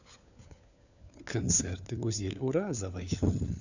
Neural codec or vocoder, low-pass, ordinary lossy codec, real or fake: codec, 16 kHz, 4 kbps, FunCodec, trained on LibriTTS, 50 frames a second; 7.2 kHz; none; fake